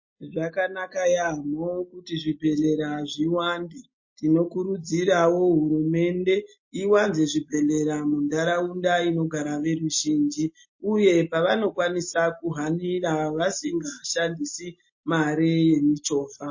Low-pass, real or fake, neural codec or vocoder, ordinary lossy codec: 7.2 kHz; real; none; MP3, 32 kbps